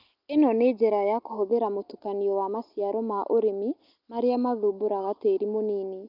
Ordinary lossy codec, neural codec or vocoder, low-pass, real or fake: Opus, 24 kbps; none; 5.4 kHz; real